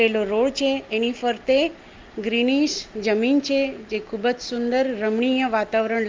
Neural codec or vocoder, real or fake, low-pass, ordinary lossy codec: none; real; 7.2 kHz; Opus, 32 kbps